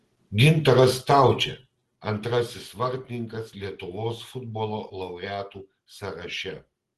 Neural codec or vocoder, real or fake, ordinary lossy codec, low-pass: none; real; Opus, 16 kbps; 10.8 kHz